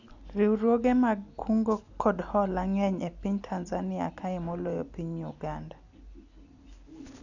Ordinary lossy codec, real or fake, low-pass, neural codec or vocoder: none; real; 7.2 kHz; none